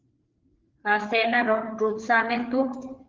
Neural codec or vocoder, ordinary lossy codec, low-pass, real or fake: codec, 16 kHz, 4 kbps, FreqCodec, larger model; Opus, 16 kbps; 7.2 kHz; fake